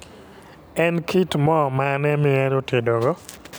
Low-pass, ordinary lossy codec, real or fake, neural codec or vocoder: none; none; real; none